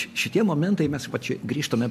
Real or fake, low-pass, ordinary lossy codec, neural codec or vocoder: real; 14.4 kHz; MP3, 64 kbps; none